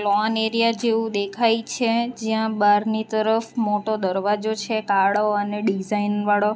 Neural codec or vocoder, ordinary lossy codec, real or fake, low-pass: none; none; real; none